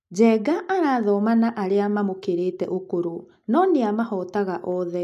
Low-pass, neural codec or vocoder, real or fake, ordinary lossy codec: 14.4 kHz; none; real; none